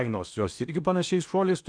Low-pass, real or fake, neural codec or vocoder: 9.9 kHz; fake; codec, 16 kHz in and 24 kHz out, 0.8 kbps, FocalCodec, streaming, 65536 codes